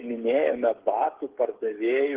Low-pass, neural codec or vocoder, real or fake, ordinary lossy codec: 3.6 kHz; codec, 16 kHz, 8 kbps, FreqCodec, smaller model; fake; Opus, 16 kbps